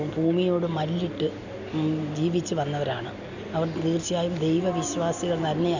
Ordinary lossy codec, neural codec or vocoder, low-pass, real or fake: none; none; 7.2 kHz; real